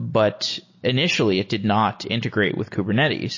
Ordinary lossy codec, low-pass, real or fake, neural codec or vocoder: MP3, 32 kbps; 7.2 kHz; real; none